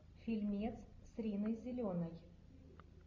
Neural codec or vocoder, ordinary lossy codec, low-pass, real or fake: none; MP3, 48 kbps; 7.2 kHz; real